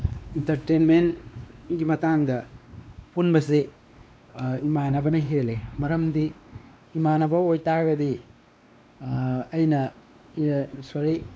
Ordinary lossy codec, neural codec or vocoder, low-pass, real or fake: none; codec, 16 kHz, 2 kbps, X-Codec, WavLM features, trained on Multilingual LibriSpeech; none; fake